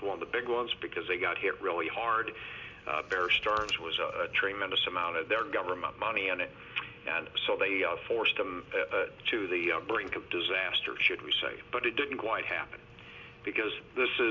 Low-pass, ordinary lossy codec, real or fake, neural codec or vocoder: 7.2 kHz; MP3, 64 kbps; real; none